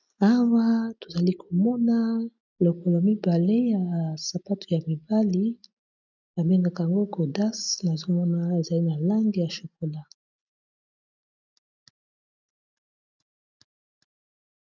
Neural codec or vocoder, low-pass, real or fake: none; 7.2 kHz; real